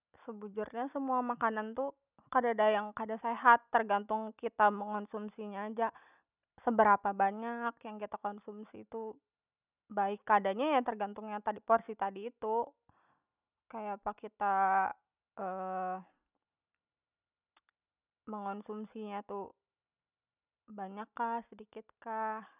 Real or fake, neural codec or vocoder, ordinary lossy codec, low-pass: real; none; none; 3.6 kHz